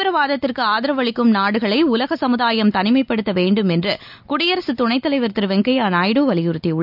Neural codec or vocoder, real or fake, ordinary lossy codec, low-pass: none; real; none; 5.4 kHz